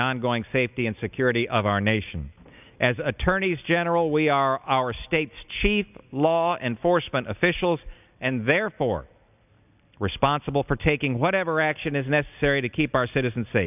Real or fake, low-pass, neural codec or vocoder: real; 3.6 kHz; none